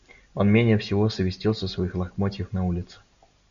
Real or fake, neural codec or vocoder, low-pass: real; none; 7.2 kHz